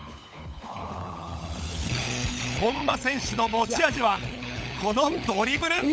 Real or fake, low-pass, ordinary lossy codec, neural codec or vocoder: fake; none; none; codec, 16 kHz, 16 kbps, FunCodec, trained on LibriTTS, 50 frames a second